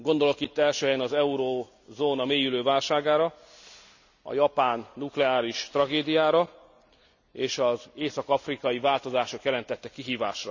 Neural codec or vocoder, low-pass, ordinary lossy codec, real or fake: none; 7.2 kHz; none; real